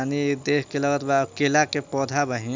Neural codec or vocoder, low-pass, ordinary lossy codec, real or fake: none; 7.2 kHz; MP3, 64 kbps; real